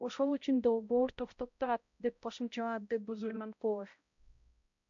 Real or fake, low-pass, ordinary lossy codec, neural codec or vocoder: fake; 7.2 kHz; AAC, 64 kbps; codec, 16 kHz, 0.5 kbps, X-Codec, HuBERT features, trained on balanced general audio